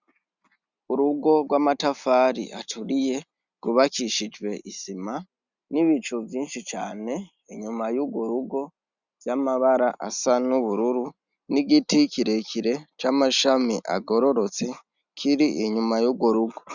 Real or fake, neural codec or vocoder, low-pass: real; none; 7.2 kHz